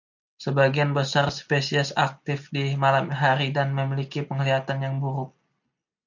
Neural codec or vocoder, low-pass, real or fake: none; 7.2 kHz; real